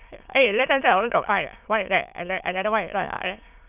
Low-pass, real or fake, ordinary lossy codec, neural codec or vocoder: 3.6 kHz; fake; none; autoencoder, 22.05 kHz, a latent of 192 numbers a frame, VITS, trained on many speakers